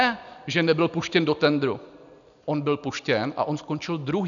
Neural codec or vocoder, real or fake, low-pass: none; real; 7.2 kHz